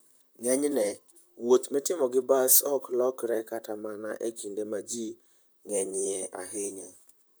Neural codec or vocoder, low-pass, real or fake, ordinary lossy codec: vocoder, 44.1 kHz, 128 mel bands, Pupu-Vocoder; none; fake; none